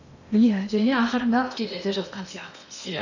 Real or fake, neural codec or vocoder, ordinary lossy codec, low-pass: fake; codec, 16 kHz in and 24 kHz out, 0.8 kbps, FocalCodec, streaming, 65536 codes; none; 7.2 kHz